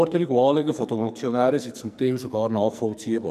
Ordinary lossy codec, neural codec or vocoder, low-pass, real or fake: none; codec, 44.1 kHz, 2.6 kbps, SNAC; 14.4 kHz; fake